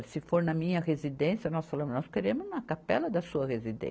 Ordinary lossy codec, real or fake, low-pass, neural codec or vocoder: none; real; none; none